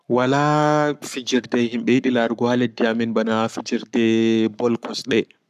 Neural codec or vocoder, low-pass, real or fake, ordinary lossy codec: codec, 44.1 kHz, 7.8 kbps, Pupu-Codec; 14.4 kHz; fake; none